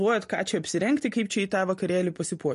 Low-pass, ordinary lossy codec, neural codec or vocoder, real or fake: 14.4 kHz; MP3, 48 kbps; none; real